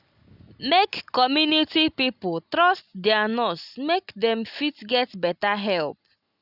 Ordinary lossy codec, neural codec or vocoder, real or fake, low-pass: none; none; real; 5.4 kHz